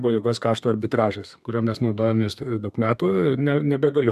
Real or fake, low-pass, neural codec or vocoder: fake; 14.4 kHz; codec, 32 kHz, 1.9 kbps, SNAC